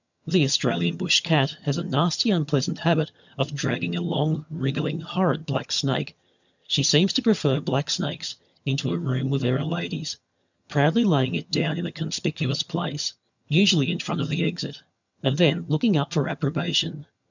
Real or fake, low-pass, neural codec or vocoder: fake; 7.2 kHz; vocoder, 22.05 kHz, 80 mel bands, HiFi-GAN